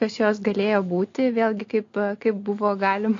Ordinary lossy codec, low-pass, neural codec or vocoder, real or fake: AAC, 48 kbps; 7.2 kHz; none; real